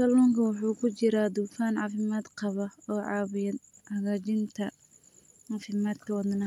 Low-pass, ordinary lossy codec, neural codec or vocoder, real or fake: 19.8 kHz; MP3, 96 kbps; none; real